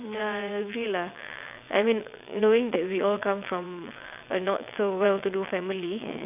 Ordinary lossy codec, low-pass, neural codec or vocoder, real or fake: none; 3.6 kHz; vocoder, 22.05 kHz, 80 mel bands, WaveNeXt; fake